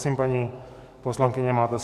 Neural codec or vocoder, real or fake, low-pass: codec, 44.1 kHz, 7.8 kbps, DAC; fake; 14.4 kHz